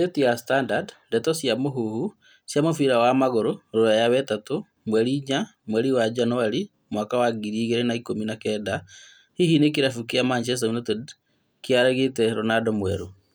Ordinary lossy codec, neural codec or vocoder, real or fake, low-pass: none; none; real; none